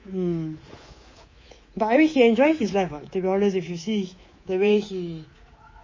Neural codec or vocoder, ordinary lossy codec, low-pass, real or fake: codec, 24 kHz, 3.1 kbps, DualCodec; MP3, 32 kbps; 7.2 kHz; fake